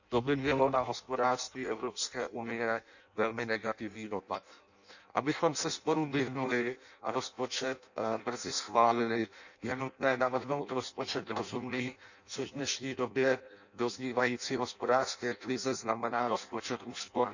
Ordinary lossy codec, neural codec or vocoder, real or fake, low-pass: none; codec, 16 kHz in and 24 kHz out, 0.6 kbps, FireRedTTS-2 codec; fake; 7.2 kHz